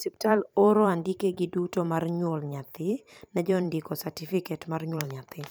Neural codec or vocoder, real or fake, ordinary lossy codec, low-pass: vocoder, 44.1 kHz, 128 mel bands every 512 samples, BigVGAN v2; fake; none; none